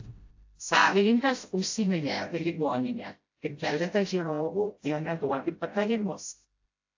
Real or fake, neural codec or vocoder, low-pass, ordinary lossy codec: fake; codec, 16 kHz, 0.5 kbps, FreqCodec, smaller model; 7.2 kHz; AAC, 48 kbps